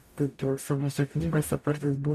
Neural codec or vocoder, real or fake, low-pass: codec, 44.1 kHz, 0.9 kbps, DAC; fake; 14.4 kHz